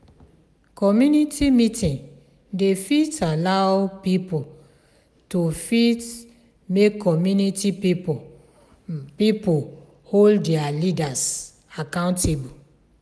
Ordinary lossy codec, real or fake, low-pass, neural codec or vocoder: none; real; none; none